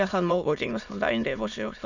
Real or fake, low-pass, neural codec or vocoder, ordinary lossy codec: fake; 7.2 kHz; autoencoder, 22.05 kHz, a latent of 192 numbers a frame, VITS, trained on many speakers; none